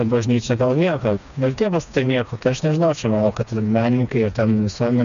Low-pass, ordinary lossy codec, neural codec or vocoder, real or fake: 7.2 kHz; MP3, 96 kbps; codec, 16 kHz, 2 kbps, FreqCodec, smaller model; fake